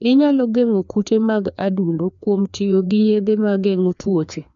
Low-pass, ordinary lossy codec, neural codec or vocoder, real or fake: 7.2 kHz; none; codec, 16 kHz, 2 kbps, FreqCodec, larger model; fake